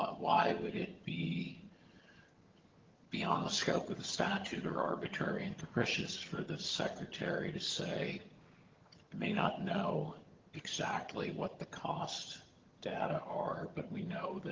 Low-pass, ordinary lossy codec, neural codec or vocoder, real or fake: 7.2 kHz; Opus, 16 kbps; vocoder, 22.05 kHz, 80 mel bands, HiFi-GAN; fake